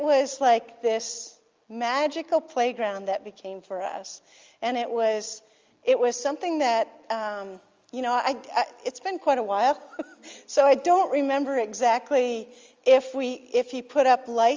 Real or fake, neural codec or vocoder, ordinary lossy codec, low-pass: real; none; Opus, 32 kbps; 7.2 kHz